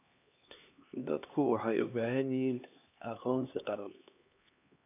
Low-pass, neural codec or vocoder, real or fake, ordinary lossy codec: 3.6 kHz; codec, 16 kHz, 2 kbps, X-Codec, HuBERT features, trained on LibriSpeech; fake; none